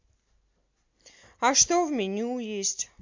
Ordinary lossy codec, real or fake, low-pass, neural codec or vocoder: MP3, 64 kbps; real; 7.2 kHz; none